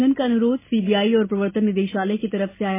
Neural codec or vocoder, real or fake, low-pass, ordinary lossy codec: none; real; 3.6 kHz; MP3, 16 kbps